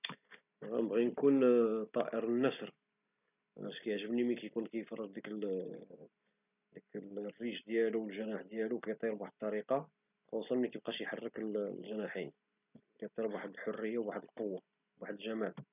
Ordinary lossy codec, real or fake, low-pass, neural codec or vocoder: none; real; 3.6 kHz; none